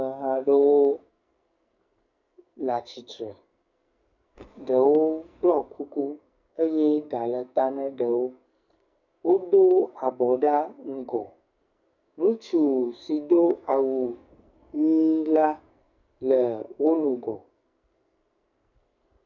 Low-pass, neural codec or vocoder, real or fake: 7.2 kHz; codec, 44.1 kHz, 2.6 kbps, SNAC; fake